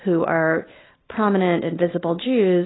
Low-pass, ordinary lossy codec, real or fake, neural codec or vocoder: 7.2 kHz; AAC, 16 kbps; real; none